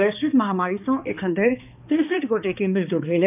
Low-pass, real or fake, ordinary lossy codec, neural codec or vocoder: 3.6 kHz; fake; none; codec, 16 kHz, 2 kbps, X-Codec, HuBERT features, trained on balanced general audio